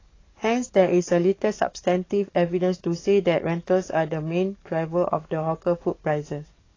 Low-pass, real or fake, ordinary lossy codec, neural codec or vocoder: 7.2 kHz; fake; AAC, 32 kbps; codec, 44.1 kHz, 7.8 kbps, DAC